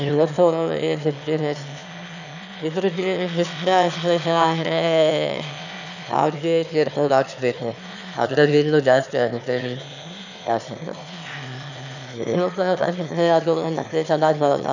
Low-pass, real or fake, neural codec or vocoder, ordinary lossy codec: 7.2 kHz; fake; autoencoder, 22.05 kHz, a latent of 192 numbers a frame, VITS, trained on one speaker; none